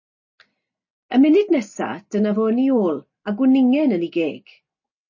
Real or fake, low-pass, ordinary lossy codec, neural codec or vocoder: real; 7.2 kHz; MP3, 32 kbps; none